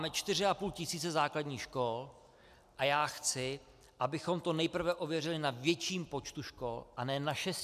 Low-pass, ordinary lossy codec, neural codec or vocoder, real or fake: 14.4 kHz; MP3, 96 kbps; none; real